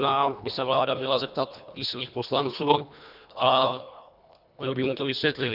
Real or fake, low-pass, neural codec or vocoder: fake; 5.4 kHz; codec, 24 kHz, 1.5 kbps, HILCodec